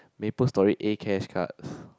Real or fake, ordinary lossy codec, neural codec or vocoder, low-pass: real; none; none; none